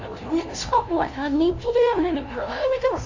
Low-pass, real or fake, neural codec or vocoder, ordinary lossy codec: 7.2 kHz; fake; codec, 16 kHz, 0.5 kbps, FunCodec, trained on LibriTTS, 25 frames a second; AAC, 48 kbps